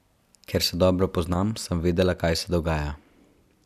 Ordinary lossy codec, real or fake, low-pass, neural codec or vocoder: none; real; 14.4 kHz; none